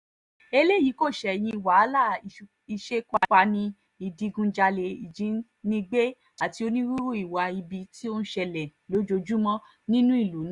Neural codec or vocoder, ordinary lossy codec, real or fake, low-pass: none; Opus, 64 kbps; real; 10.8 kHz